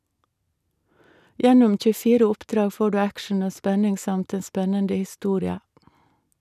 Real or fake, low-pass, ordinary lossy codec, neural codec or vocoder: real; 14.4 kHz; none; none